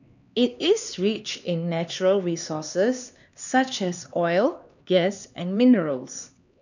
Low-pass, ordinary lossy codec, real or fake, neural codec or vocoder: 7.2 kHz; none; fake; codec, 16 kHz, 2 kbps, X-Codec, HuBERT features, trained on LibriSpeech